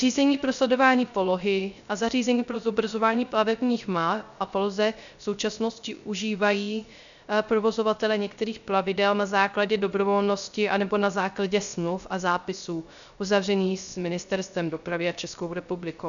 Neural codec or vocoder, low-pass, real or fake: codec, 16 kHz, 0.3 kbps, FocalCodec; 7.2 kHz; fake